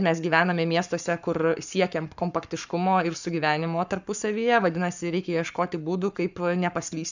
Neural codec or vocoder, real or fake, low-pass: codec, 44.1 kHz, 7.8 kbps, Pupu-Codec; fake; 7.2 kHz